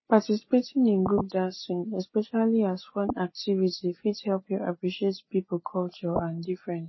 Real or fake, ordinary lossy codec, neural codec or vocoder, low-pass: real; MP3, 24 kbps; none; 7.2 kHz